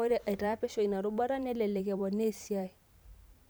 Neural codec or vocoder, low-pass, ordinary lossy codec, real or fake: vocoder, 44.1 kHz, 128 mel bands every 512 samples, BigVGAN v2; none; none; fake